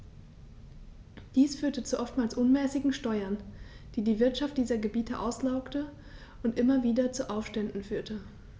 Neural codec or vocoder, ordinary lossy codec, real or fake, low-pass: none; none; real; none